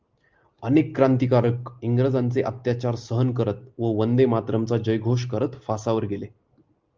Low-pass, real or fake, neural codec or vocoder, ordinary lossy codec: 7.2 kHz; real; none; Opus, 32 kbps